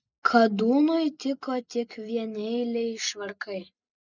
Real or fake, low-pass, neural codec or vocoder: real; 7.2 kHz; none